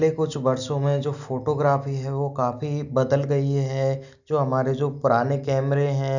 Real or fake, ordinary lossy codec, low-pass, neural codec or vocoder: real; none; 7.2 kHz; none